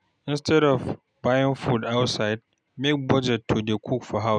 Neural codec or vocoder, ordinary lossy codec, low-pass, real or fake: none; none; 9.9 kHz; real